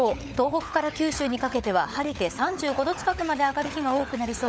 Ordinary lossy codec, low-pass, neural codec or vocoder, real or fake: none; none; codec, 16 kHz, 16 kbps, FunCodec, trained on LibriTTS, 50 frames a second; fake